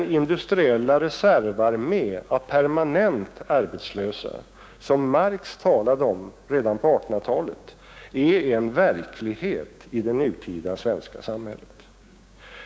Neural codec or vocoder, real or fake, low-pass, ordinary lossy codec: codec, 16 kHz, 6 kbps, DAC; fake; none; none